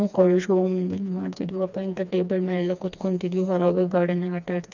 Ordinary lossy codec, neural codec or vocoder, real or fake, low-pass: none; codec, 16 kHz, 2 kbps, FreqCodec, smaller model; fake; 7.2 kHz